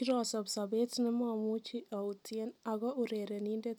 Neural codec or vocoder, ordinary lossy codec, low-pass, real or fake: none; none; none; real